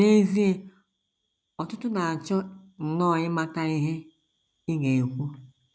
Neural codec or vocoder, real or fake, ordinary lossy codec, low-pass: none; real; none; none